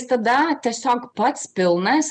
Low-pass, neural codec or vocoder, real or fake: 9.9 kHz; none; real